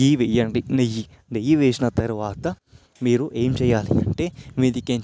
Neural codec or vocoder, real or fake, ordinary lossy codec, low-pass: none; real; none; none